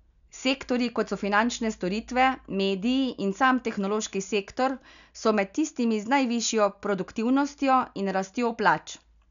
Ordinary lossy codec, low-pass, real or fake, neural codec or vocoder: none; 7.2 kHz; real; none